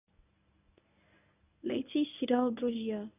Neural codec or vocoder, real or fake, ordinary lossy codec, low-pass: codec, 24 kHz, 0.9 kbps, WavTokenizer, medium speech release version 1; fake; none; 3.6 kHz